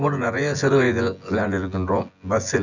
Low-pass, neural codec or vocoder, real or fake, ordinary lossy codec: 7.2 kHz; vocoder, 24 kHz, 100 mel bands, Vocos; fake; none